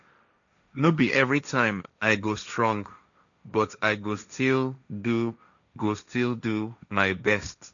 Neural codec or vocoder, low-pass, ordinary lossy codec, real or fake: codec, 16 kHz, 1.1 kbps, Voila-Tokenizer; 7.2 kHz; none; fake